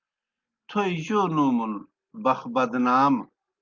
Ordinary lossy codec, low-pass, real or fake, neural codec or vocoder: Opus, 24 kbps; 7.2 kHz; real; none